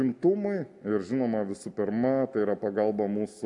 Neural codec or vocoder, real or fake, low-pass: none; real; 10.8 kHz